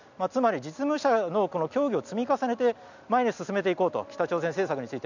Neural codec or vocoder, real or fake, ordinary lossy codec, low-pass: none; real; none; 7.2 kHz